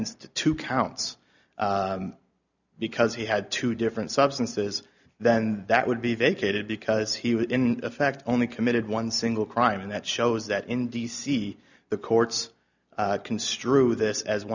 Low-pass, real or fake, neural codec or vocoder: 7.2 kHz; real; none